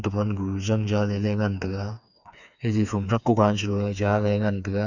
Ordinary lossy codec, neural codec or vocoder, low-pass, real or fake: none; codec, 16 kHz, 4 kbps, FreqCodec, smaller model; 7.2 kHz; fake